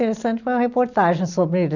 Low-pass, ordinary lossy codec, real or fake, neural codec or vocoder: 7.2 kHz; none; real; none